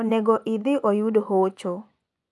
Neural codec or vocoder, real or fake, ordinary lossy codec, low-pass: vocoder, 24 kHz, 100 mel bands, Vocos; fake; none; none